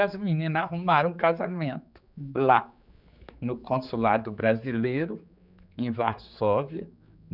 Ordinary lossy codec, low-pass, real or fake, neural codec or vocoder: none; 5.4 kHz; fake; codec, 16 kHz, 4 kbps, X-Codec, HuBERT features, trained on general audio